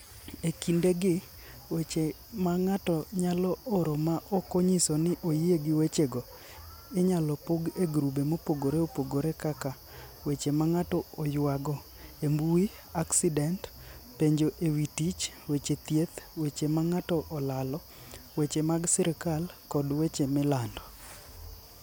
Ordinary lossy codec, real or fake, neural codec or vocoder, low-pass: none; real; none; none